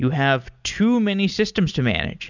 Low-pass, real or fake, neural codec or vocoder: 7.2 kHz; real; none